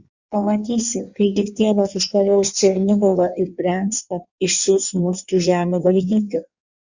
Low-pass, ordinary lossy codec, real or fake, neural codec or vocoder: 7.2 kHz; Opus, 64 kbps; fake; codec, 16 kHz in and 24 kHz out, 1.1 kbps, FireRedTTS-2 codec